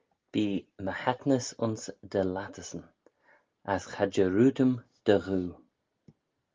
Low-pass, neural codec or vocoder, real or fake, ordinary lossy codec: 7.2 kHz; none; real; Opus, 24 kbps